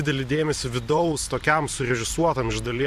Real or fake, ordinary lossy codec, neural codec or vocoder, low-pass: fake; MP3, 64 kbps; vocoder, 44.1 kHz, 128 mel bands every 512 samples, BigVGAN v2; 14.4 kHz